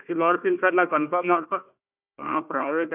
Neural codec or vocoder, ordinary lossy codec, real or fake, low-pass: codec, 16 kHz, 1 kbps, FunCodec, trained on Chinese and English, 50 frames a second; none; fake; 3.6 kHz